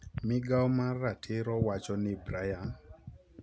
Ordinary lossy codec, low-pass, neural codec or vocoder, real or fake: none; none; none; real